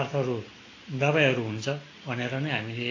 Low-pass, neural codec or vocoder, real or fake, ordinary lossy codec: 7.2 kHz; none; real; AAC, 32 kbps